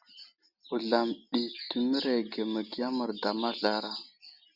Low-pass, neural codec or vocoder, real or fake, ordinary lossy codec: 5.4 kHz; none; real; Opus, 64 kbps